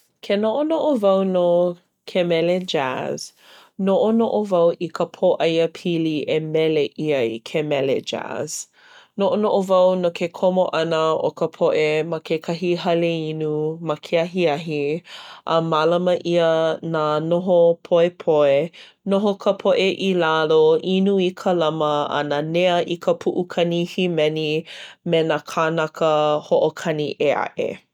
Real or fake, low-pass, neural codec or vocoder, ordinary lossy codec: real; 19.8 kHz; none; none